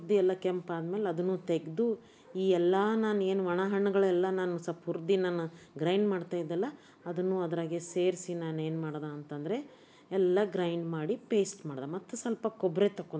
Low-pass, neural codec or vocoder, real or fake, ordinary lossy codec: none; none; real; none